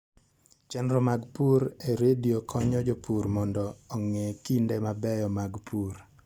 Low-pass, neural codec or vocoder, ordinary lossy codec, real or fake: 19.8 kHz; none; Opus, 64 kbps; real